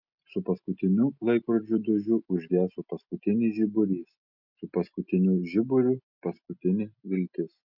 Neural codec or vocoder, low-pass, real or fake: none; 5.4 kHz; real